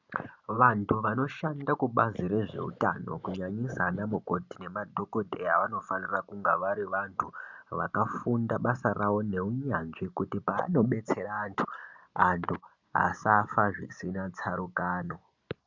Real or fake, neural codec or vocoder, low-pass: real; none; 7.2 kHz